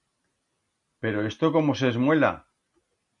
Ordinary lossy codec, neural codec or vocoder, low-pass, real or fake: MP3, 64 kbps; none; 10.8 kHz; real